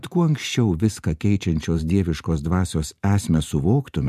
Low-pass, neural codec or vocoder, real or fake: 14.4 kHz; vocoder, 44.1 kHz, 128 mel bands every 512 samples, BigVGAN v2; fake